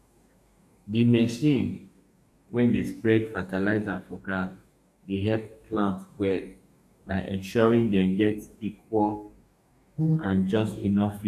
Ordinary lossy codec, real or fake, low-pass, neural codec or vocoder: none; fake; 14.4 kHz; codec, 44.1 kHz, 2.6 kbps, DAC